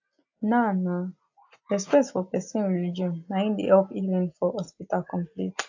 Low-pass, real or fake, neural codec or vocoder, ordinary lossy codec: 7.2 kHz; real; none; AAC, 48 kbps